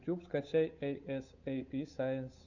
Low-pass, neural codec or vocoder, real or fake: 7.2 kHz; codec, 16 kHz, 8 kbps, FunCodec, trained on Chinese and English, 25 frames a second; fake